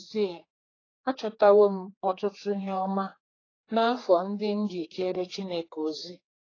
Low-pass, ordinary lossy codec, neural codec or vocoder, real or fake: 7.2 kHz; AAC, 32 kbps; codec, 16 kHz, 4 kbps, X-Codec, HuBERT features, trained on general audio; fake